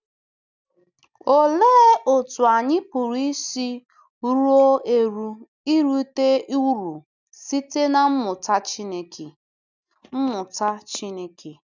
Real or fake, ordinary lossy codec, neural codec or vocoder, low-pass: real; none; none; 7.2 kHz